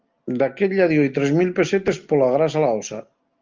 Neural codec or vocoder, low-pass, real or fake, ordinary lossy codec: none; 7.2 kHz; real; Opus, 24 kbps